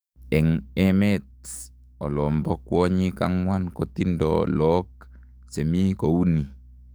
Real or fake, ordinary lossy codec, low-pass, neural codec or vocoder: fake; none; none; codec, 44.1 kHz, 7.8 kbps, DAC